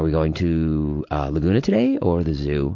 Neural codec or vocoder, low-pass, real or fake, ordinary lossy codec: none; 7.2 kHz; real; MP3, 48 kbps